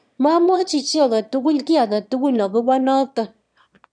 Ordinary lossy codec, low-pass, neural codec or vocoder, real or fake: none; 9.9 kHz; autoencoder, 22.05 kHz, a latent of 192 numbers a frame, VITS, trained on one speaker; fake